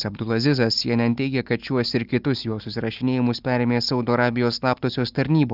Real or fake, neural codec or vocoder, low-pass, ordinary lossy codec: real; none; 5.4 kHz; Opus, 32 kbps